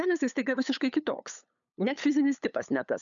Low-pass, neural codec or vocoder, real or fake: 7.2 kHz; codec, 16 kHz, 8 kbps, FunCodec, trained on LibriTTS, 25 frames a second; fake